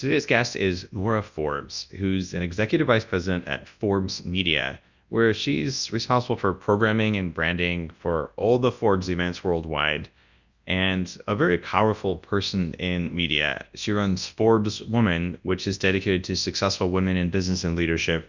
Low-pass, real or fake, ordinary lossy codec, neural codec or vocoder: 7.2 kHz; fake; Opus, 64 kbps; codec, 24 kHz, 0.9 kbps, WavTokenizer, large speech release